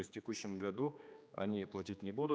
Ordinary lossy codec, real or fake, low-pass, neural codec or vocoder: none; fake; none; codec, 16 kHz, 2 kbps, X-Codec, HuBERT features, trained on general audio